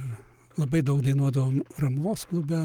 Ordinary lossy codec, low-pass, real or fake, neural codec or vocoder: Opus, 32 kbps; 19.8 kHz; fake; codec, 44.1 kHz, 7.8 kbps, Pupu-Codec